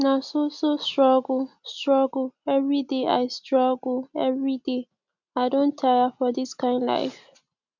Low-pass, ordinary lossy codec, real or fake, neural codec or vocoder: 7.2 kHz; none; real; none